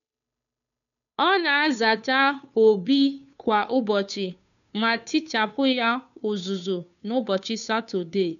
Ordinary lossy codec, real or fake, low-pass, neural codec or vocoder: MP3, 96 kbps; fake; 7.2 kHz; codec, 16 kHz, 2 kbps, FunCodec, trained on Chinese and English, 25 frames a second